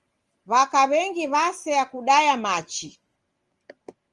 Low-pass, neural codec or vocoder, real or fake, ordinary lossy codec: 10.8 kHz; none; real; Opus, 24 kbps